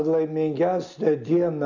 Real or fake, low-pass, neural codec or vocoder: real; 7.2 kHz; none